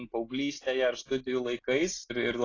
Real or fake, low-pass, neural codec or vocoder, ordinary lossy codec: real; 7.2 kHz; none; AAC, 32 kbps